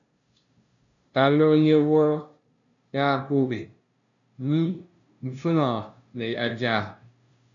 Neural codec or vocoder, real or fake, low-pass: codec, 16 kHz, 0.5 kbps, FunCodec, trained on LibriTTS, 25 frames a second; fake; 7.2 kHz